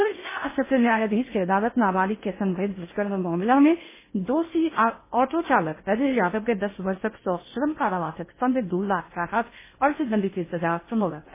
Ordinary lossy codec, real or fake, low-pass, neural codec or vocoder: MP3, 16 kbps; fake; 3.6 kHz; codec, 16 kHz in and 24 kHz out, 0.6 kbps, FocalCodec, streaming, 4096 codes